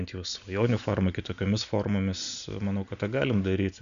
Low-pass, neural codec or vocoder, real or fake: 7.2 kHz; none; real